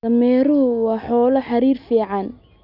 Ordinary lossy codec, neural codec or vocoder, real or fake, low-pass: none; none; real; 5.4 kHz